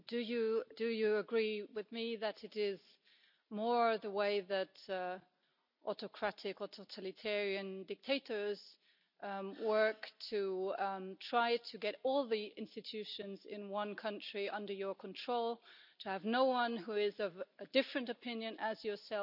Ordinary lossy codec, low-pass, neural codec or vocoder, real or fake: none; 5.4 kHz; none; real